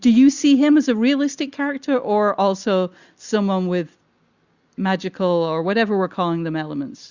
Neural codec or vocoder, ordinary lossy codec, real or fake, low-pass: none; Opus, 64 kbps; real; 7.2 kHz